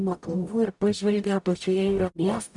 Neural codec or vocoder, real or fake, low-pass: codec, 44.1 kHz, 0.9 kbps, DAC; fake; 10.8 kHz